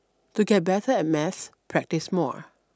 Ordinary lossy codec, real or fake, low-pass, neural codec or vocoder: none; real; none; none